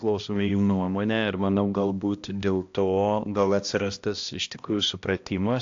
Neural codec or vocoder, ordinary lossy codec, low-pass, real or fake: codec, 16 kHz, 1 kbps, X-Codec, HuBERT features, trained on balanced general audio; AAC, 48 kbps; 7.2 kHz; fake